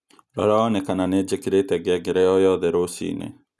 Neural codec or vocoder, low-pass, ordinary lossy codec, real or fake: none; none; none; real